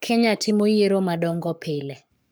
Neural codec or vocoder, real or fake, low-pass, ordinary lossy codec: codec, 44.1 kHz, 7.8 kbps, Pupu-Codec; fake; none; none